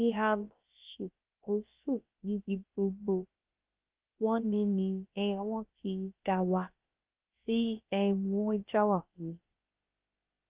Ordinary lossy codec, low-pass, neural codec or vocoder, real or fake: Opus, 32 kbps; 3.6 kHz; codec, 16 kHz, about 1 kbps, DyCAST, with the encoder's durations; fake